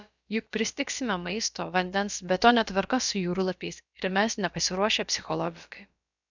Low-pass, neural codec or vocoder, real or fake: 7.2 kHz; codec, 16 kHz, about 1 kbps, DyCAST, with the encoder's durations; fake